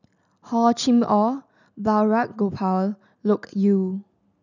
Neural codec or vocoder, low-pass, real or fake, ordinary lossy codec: none; 7.2 kHz; real; none